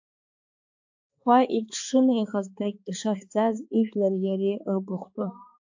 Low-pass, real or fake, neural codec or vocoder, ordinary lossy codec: 7.2 kHz; fake; codec, 16 kHz, 4 kbps, X-Codec, HuBERT features, trained on balanced general audio; AAC, 48 kbps